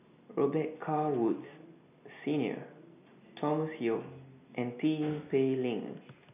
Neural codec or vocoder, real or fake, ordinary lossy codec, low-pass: none; real; none; 3.6 kHz